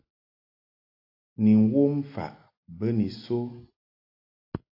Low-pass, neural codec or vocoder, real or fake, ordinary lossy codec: 5.4 kHz; none; real; AAC, 32 kbps